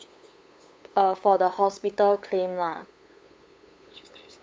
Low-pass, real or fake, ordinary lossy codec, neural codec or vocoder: none; fake; none; codec, 16 kHz, 8 kbps, FunCodec, trained on LibriTTS, 25 frames a second